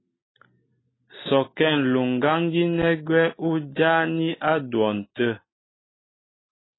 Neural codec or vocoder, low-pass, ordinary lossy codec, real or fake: none; 7.2 kHz; AAC, 16 kbps; real